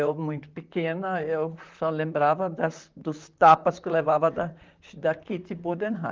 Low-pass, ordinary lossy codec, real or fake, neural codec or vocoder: 7.2 kHz; Opus, 24 kbps; fake; vocoder, 44.1 kHz, 128 mel bands, Pupu-Vocoder